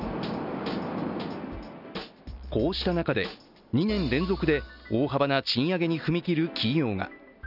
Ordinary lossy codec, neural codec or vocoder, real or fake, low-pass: none; none; real; 5.4 kHz